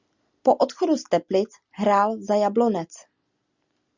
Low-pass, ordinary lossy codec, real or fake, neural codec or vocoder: 7.2 kHz; Opus, 64 kbps; real; none